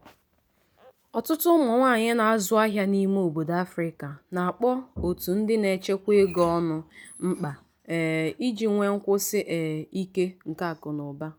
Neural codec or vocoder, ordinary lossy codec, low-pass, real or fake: none; none; none; real